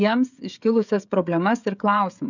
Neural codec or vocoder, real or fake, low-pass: codec, 16 kHz, 16 kbps, FreqCodec, smaller model; fake; 7.2 kHz